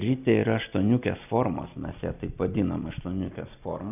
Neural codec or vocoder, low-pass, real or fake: none; 3.6 kHz; real